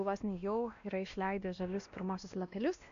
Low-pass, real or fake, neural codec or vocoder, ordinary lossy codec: 7.2 kHz; fake; codec, 16 kHz, 1 kbps, X-Codec, WavLM features, trained on Multilingual LibriSpeech; AAC, 64 kbps